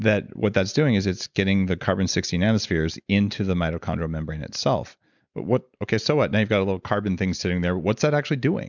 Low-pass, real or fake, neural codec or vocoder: 7.2 kHz; real; none